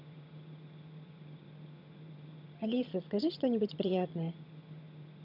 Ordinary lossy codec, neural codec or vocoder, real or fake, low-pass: none; vocoder, 22.05 kHz, 80 mel bands, HiFi-GAN; fake; 5.4 kHz